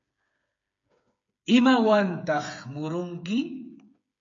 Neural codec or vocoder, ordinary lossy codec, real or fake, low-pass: codec, 16 kHz, 8 kbps, FreqCodec, smaller model; MP3, 48 kbps; fake; 7.2 kHz